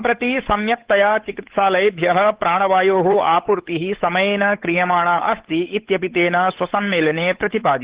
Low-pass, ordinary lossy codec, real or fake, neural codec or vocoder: 3.6 kHz; Opus, 16 kbps; fake; codec, 16 kHz, 8 kbps, FunCodec, trained on Chinese and English, 25 frames a second